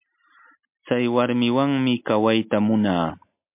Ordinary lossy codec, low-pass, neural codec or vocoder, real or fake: MP3, 32 kbps; 3.6 kHz; none; real